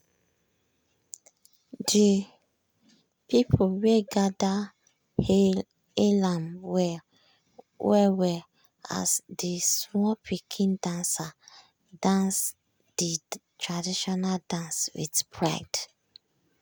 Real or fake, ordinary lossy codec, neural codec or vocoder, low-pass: real; none; none; none